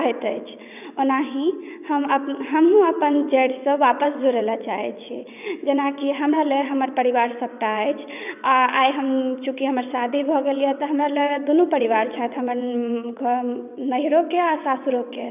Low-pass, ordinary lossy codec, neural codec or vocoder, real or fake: 3.6 kHz; none; vocoder, 22.05 kHz, 80 mel bands, WaveNeXt; fake